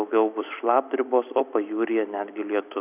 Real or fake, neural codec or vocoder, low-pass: fake; vocoder, 44.1 kHz, 128 mel bands every 256 samples, BigVGAN v2; 3.6 kHz